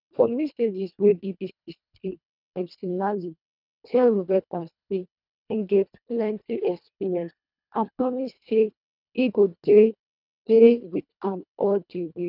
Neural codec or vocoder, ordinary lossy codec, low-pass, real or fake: codec, 24 kHz, 1.5 kbps, HILCodec; none; 5.4 kHz; fake